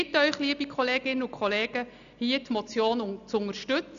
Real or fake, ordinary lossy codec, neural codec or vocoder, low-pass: real; none; none; 7.2 kHz